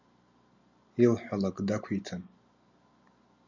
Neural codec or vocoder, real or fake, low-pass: none; real; 7.2 kHz